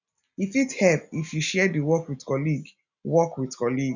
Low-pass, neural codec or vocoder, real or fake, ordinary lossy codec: 7.2 kHz; none; real; none